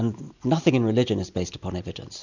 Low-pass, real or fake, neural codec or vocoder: 7.2 kHz; real; none